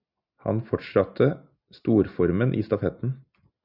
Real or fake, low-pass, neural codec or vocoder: real; 5.4 kHz; none